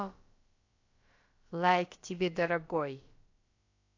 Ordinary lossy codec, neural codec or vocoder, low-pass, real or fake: AAC, 48 kbps; codec, 16 kHz, about 1 kbps, DyCAST, with the encoder's durations; 7.2 kHz; fake